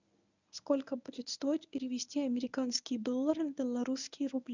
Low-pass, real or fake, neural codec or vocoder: 7.2 kHz; fake; codec, 24 kHz, 0.9 kbps, WavTokenizer, medium speech release version 1